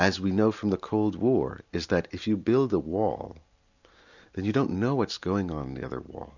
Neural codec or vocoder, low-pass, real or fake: none; 7.2 kHz; real